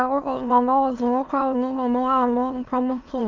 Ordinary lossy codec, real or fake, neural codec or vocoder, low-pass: Opus, 24 kbps; fake; autoencoder, 22.05 kHz, a latent of 192 numbers a frame, VITS, trained on many speakers; 7.2 kHz